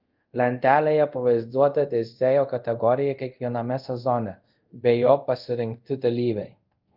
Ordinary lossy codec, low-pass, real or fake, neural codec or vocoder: Opus, 32 kbps; 5.4 kHz; fake; codec, 24 kHz, 0.5 kbps, DualCodec